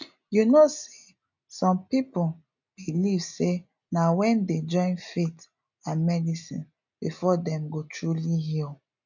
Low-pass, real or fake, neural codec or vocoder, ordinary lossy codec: 7.2 kHz; real; none; none